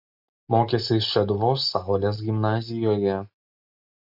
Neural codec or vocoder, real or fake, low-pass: none; real; 5.4 kHz